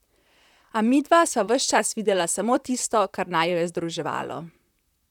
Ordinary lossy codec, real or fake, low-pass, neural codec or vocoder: none; fake; 19.8 kHz; vocoder, 44.1 kHz, 128 mel bands, Pupu-Vocoder